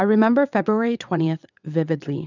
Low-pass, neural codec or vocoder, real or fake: 7.2 kHz; vocoder, 22.05 kHz, 80 mel bands, WaveNeXt; fake